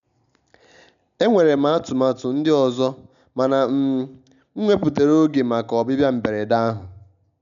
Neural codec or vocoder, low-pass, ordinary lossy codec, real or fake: none; 7.2 kHz; none; real